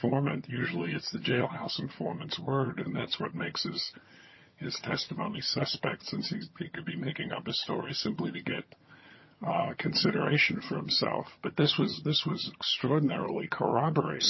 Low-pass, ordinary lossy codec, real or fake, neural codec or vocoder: 7.2 kHz; MP3, 24 kbps; fake; vocoder, 22.05 kHz, 80 mel bands, HiFi-GAN